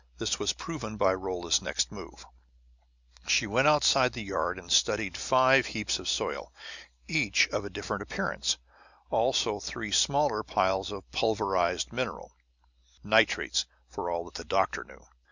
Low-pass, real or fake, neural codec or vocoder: 7.2 kHz; real; none